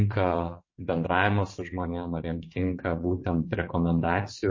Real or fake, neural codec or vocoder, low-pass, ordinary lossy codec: fake; vocoder, 22.05 kHz, 80 mel bands, WaveNeXt; 7.2 kHz; MP3, 32 kbps